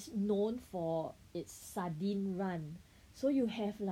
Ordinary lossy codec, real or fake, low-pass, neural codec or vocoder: none; real; 19.8 kHz; none